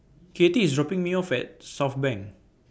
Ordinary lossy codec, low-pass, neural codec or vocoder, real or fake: none; none; none; real